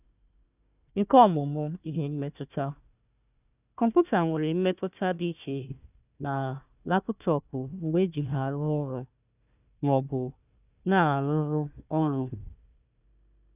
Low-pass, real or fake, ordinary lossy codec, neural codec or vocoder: 3.6 kHz; fake; none; codec, 16 kHz, 1 kbps, FunCodec, trained on Chinese and English, 50 frames a second